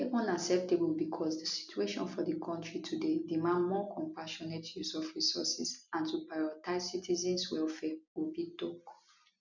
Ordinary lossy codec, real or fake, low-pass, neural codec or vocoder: none; real; 7.2 kHz; none